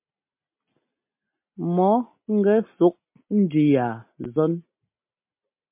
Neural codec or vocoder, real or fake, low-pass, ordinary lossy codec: none; real; 3.6 kHz; MP3, 32 kbps